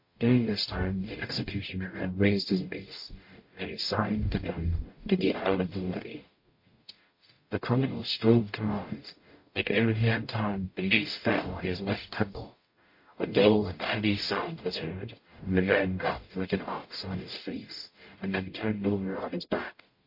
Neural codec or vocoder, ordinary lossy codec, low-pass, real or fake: codec, 44.1 kHz, 0.9 kbps, DAC; MP3, 32 kbps; 5.4 kHz; fake